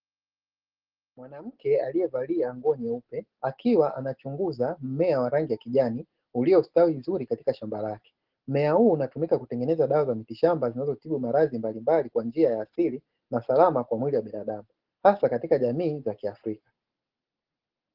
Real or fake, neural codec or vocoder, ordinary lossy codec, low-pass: real; none; Opus, 16 kbps; 5.4 kHz